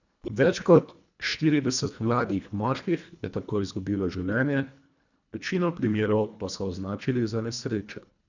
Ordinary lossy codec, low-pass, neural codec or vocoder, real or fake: none; 7.2 kHz; codec, 24 kHz, 1.5 kbps, HILCodec; fake